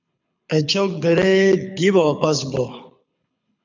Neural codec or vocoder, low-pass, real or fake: codec, 24 kHz, 6 kbps, HILCodec; 7.2 kHz; fake